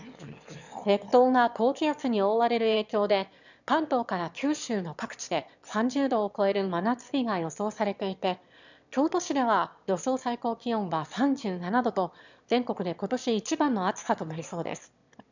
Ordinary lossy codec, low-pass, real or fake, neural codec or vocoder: none; 7.2 kHz; fake; autoencoder, 22.05 kHz, a latent of 192 numbers a frame, VITS, trained on one speaker